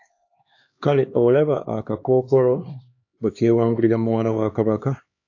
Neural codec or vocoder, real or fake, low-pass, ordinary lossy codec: codec, 16 kHz, 2 kbps, X-Codec, HuBERT features, trained on LibriSpeech; fake; 7.2 kHz; AAC, 48 kbps